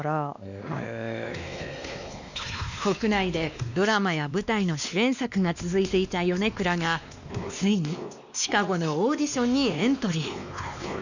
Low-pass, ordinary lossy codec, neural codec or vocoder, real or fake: 7.2 kHz; none; codec, 16 kHz, 2 kbps, X-Codec, WavLM features, trained on Multilingual LibriSpeech; fake